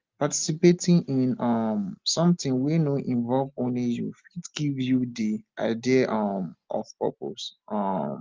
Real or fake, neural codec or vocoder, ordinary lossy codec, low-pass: real; none; Opus, 24 kbps; 7.2 kHz